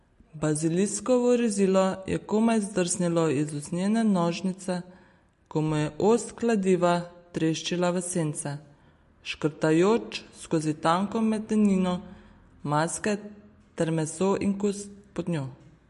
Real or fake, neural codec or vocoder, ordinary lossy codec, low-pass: real; none; MP3, 48 kbps; 14.4 kHz